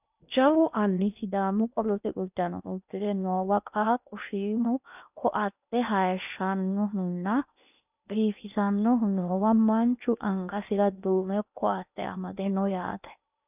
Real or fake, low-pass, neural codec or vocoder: fake; 3.6 kHz; codec, 16 kHz in and 24 kHz out, 0.8 kbps, FocalCodec, streaming, 65536 codes